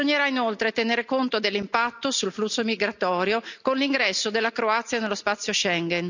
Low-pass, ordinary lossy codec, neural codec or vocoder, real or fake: 7.2 kHz; none; none; real